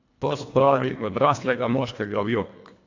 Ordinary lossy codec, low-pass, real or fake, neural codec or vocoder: AAC, 48 kbps; 7.2 kHz; fake; codec, 24 kHz, 1.5 kbps, HILCodec